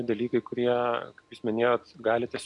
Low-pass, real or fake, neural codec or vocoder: 10.8 kHz; real; none